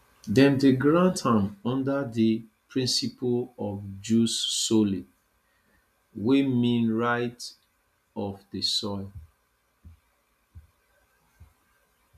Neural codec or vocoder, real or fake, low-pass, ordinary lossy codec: none; real; 14.4 kHz; none